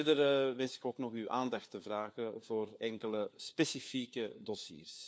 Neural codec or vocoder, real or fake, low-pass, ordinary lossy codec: codec, 16 kHz, 4 kbps, FunCodec, trained on LibriTTS, 50 frames a second; fake; none; none